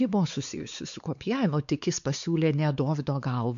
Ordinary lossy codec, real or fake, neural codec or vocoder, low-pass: MP3, 64 kbps; fake; codec, 16 kHz, 4 kbps, X-Codec, WavLM features, trained on Multilingual LibriSpeech; 7.2 kHz